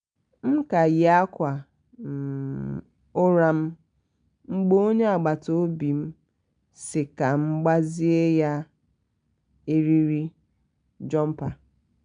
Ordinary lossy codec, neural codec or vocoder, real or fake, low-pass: none; none; real; 9.9 kHz